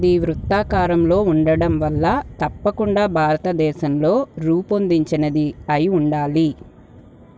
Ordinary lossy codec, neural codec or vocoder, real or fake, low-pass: none; none; real; none